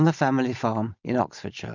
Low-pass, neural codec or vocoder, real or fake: 7.2 kHz; none; real